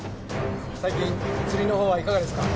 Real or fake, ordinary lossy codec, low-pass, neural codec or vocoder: real; none; none; none